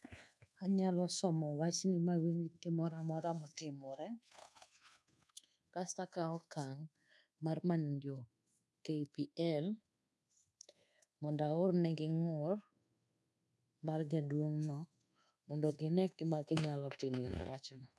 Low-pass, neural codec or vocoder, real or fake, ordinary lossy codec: none; codec, 24 kHz, 1.2 kbps, DualCodec; fake; none